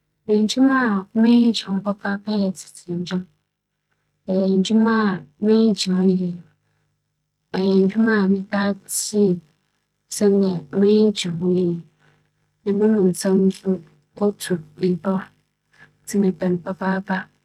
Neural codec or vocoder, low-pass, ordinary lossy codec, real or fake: vocoder, 44.1 kHz, 128 mel bands every 512 samples, BigVGAN v2; 19.8 kHz; none; fake